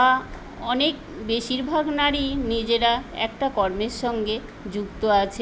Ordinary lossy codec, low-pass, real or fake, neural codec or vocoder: none; none; real; none